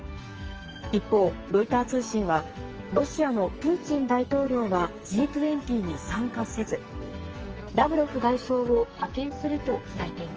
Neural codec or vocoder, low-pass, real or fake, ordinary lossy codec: codec, 44.1 kHz, 2.6 kbps, SNAC; 7.2 kHz; fake; Opus, 24 kbps